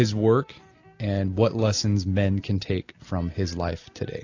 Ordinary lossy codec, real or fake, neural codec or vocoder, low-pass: AAC, 48 kbps; real; none; 7.2 kHz